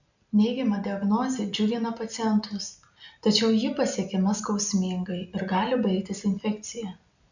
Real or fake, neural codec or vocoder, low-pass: real; none; 7.2 kHz